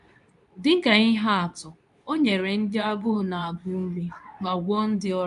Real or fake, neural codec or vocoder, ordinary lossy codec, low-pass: fake; codec, 24 kHz, 0.9 kbps, WavTokenizer, medium speech release version 2; none; 10.8 kHz